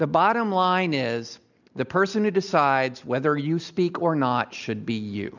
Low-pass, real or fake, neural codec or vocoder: 7.2 kHz; real; none